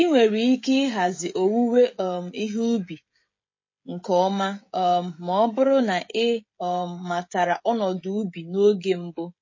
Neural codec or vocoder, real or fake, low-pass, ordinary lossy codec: codec, 16 kHz, 16 kbps, FreqCodec, smaller model; fake; 7.2 kHz; MP3, 32 kbps